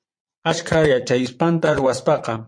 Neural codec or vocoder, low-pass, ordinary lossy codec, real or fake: vocoder, 22.05 kHz, 80 mel bands, Vocos; 9.9 kHz; MP3, 48 kbps; fake